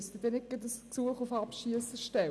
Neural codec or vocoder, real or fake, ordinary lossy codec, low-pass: none; real; none; none